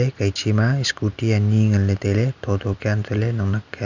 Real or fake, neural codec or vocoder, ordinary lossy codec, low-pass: real; none; none; 7.2 kHz